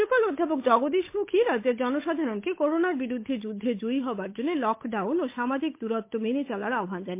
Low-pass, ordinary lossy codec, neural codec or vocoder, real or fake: 3.6 kHz; MP3, 24 kbps; codec, 16 kHz, 8 kbps, FunCodec, trained on Chinese and English, 25 frames a second; fake